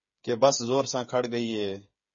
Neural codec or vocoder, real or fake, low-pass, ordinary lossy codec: codec, 16 kHz, 8 kbps, FreqCodec, smaller model; fake; 7.2 kHz; MP3, 32 kbps